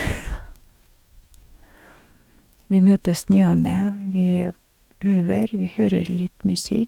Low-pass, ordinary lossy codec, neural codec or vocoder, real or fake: 19.8 kHz; none; codec, 44.1 kHz, 2.6 kbps, DAC; fake